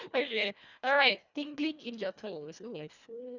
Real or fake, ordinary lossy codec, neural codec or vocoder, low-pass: fake; none; codec, 24 kHz, 1.5 kbps, HILCodec; 7.2 kHz